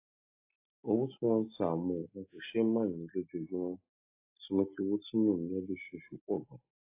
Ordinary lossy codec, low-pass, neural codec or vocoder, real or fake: AAC, 32 kbps; 3.6 kHz; none; real